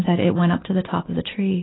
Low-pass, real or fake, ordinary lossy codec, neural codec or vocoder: 7.2 kHz; real; AAC, 16 kbps; none